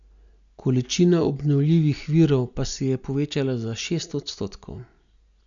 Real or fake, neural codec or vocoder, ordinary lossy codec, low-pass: real; none; none; 7.2 kHz